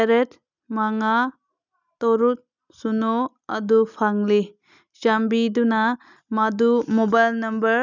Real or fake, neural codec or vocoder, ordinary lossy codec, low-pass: real; none; none; 7.2 kHz